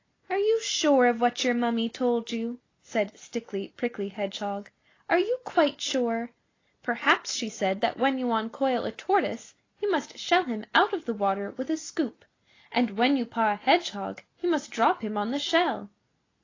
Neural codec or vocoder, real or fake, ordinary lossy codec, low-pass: none; real; AAC, 32 kbps; 7.2 kHz